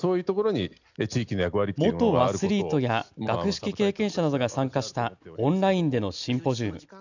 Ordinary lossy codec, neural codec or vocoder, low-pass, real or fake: none; none; 7.2 kHz; real